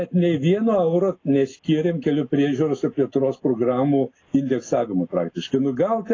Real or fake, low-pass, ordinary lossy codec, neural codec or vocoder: real; 7.2 kHz; AAC, 32 kbps; none